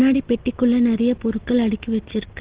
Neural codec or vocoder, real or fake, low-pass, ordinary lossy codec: none; real; 3.6 kHz; Opus, 16 kbps